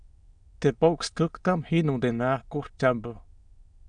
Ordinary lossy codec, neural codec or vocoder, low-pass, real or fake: Opus, 64 kbps; autoencoder, 22.05 kHz, a latent of 192 numbers a frame, VITS, trained on many speakers; 9.9 kHz; fake